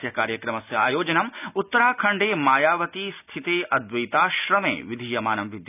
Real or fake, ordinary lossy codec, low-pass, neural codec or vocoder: real; none; 3.6 kHz; none